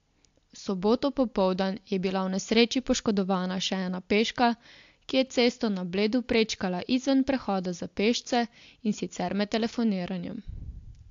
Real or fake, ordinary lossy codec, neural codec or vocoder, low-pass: real; AAC, 64 kbps; none; 7.2 kHz